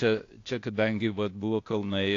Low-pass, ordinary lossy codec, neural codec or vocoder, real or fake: 7.2 kHz; AAC, 48 kbps; codec, 16 kHz, 0.8 kbps, ZipCodec; fake